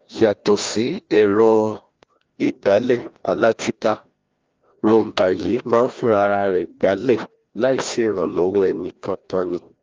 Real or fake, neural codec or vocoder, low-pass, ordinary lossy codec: fake; codec, 16 kHz, 1 kbps, FreqCodec, larger model; 7.2 kHz; Opus, 32 kbps